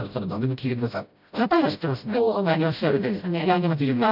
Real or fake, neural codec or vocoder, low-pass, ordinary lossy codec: fake; codec, 16 kHz, 0.5 kbps, FreqCodec, smaller model; 5.4 kHz; none